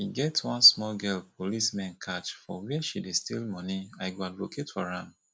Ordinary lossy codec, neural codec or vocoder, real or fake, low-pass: none; none; real; none